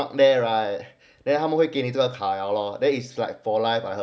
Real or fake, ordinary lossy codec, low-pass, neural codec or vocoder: real; none; none; none